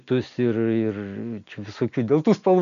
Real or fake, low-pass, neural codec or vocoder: real; 7.2 kHz; none